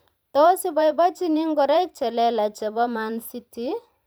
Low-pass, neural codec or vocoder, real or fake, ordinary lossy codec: none; vocoder, 44.1 kHz, 128 mel bands every 512 samples, BigVGAN v2; fake; none